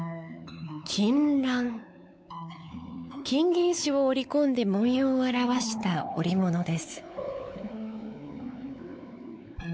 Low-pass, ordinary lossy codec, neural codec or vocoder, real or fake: none; none; codec, 16 kHz, 4 kbps, X-Codec, WavLM features, trained on Multilingual LibriSpeech; fake